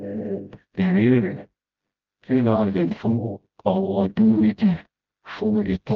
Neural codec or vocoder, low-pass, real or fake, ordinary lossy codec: codec, 16 kHz, 0.5 kbps, FreqCodec, smaller model; 7.2 kHz; fake; Opus, 24 kbps